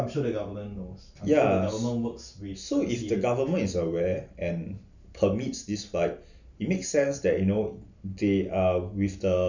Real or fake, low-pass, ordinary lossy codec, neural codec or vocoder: real; 7.2 kHz; none; none